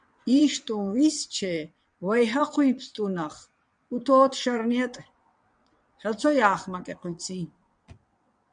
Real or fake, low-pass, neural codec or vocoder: fake; 9.9 kHz; vocoder, 22.05 kHz, 80 mel bands, WaveNeXt